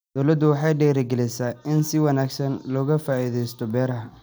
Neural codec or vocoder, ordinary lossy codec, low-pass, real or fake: none; none; none; real